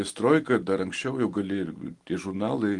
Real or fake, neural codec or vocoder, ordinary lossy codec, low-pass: real; none; Opus, 24 kbps; 10.8 kHz